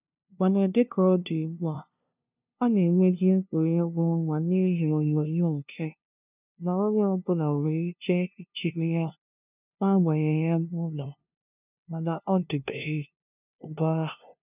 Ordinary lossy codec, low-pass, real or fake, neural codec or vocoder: none; 3.6 kHz; fake; codec, 16 kHz, 0.5 kbps, FunCodec, trained on LibriTTS, 25 frames a second